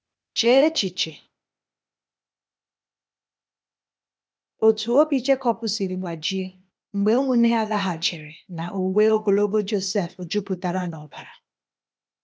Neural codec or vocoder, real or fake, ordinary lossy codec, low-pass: codec, 16 kHz, 0.8 kbps, ZipCodec; fake; none; none